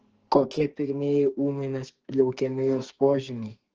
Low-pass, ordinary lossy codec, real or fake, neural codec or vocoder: 7.2 kHz; Opus, 16 kbps; fake; codec, 44.1 kHz, 2.6 kbps, SNAC